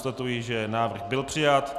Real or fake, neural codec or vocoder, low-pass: real; none; 14.4 kHz